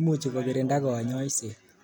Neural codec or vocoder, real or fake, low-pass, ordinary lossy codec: none; real; none; none